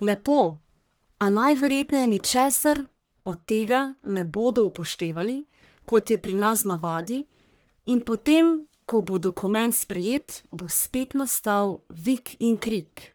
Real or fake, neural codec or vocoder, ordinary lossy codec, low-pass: fake; codec, 44.1 kHz, 1.7 kbps, Pupu-Codec; none; none